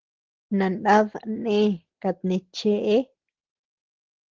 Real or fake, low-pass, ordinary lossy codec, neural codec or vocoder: real; 7.2 kHz; Opus, 16 kbps; none